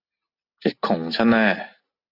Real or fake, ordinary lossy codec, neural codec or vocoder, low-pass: real; AAC, 32 kbps; none; 5.4 kHz